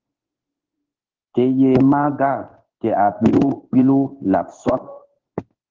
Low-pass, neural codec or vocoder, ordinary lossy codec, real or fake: 7.2 kHz; codec, 16 kHz in and 24 kHz out, 1 kbps, XY-Tokenizer; Opus, 16 kbps; fake